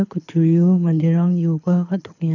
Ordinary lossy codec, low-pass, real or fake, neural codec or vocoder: none; 7.2 kHz; fake; codec, 24 kHz, 6 kbps, HILCodec